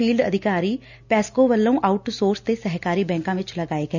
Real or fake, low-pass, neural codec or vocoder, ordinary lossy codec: real; 7.2 kHz; none; none